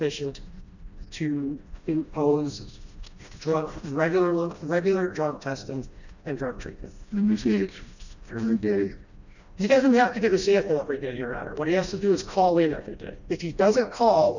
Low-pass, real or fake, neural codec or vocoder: 7.2 kHz; fake; codec, 16 kHz, 1 kbps, FreqCodec, smaller model